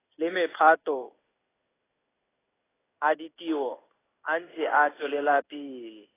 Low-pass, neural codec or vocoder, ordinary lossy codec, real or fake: 3.6 kHz; codec, 16 kHz in and 24 kHz out, 1 kbps, XY-Tokenizer; AAC, 16 kbps; fake